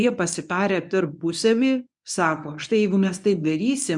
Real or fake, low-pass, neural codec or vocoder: fake; 10.8 kHz; codec, 24 kHz, 0.9 kbps, WavTokenizer, medium speech release version 1